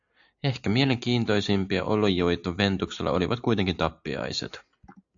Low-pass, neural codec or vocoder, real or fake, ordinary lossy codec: 7.2 kHz; none; real; AAC, 64 kbps